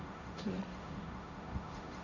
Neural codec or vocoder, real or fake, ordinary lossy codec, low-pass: codec, 16 kHz, 1.1 kbps, Voila-Tokenizer; fake; none; 7.2 kHz